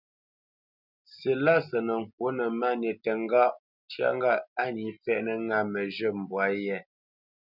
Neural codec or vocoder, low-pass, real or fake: none; 5.4 kHz; real